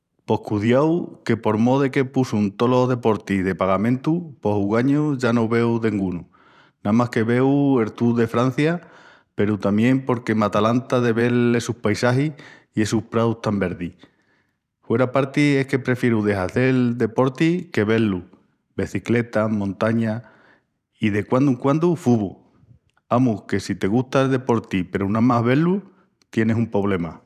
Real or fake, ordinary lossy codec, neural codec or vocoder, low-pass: fake; none; vocoder, 44.1 kHz, 128 mel bands every 512 samples, BigVGAN v2; 14.4 kHz